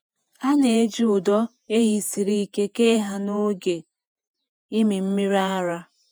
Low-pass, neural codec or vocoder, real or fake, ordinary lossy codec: none; vocoder, 48 kHz, 128 mel bands, Vocos; fake; none